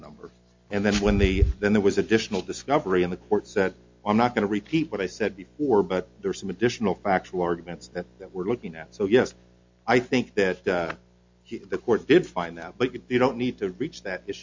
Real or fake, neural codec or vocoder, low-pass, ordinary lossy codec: real; none; 7.2 kHz; MP3, 48 kbps